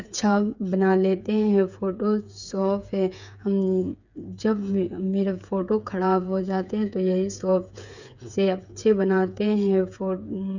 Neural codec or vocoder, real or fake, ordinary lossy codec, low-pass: codec, 16 kHz, 8 kbps, FreqCodec, smaller model; fake; none; 7.2 kHz